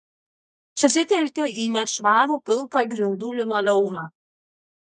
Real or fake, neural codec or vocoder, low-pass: fake; codec, 24 kHz, 0.9 kbps, WavTokenizer, medium music audio release; 10.8 kHz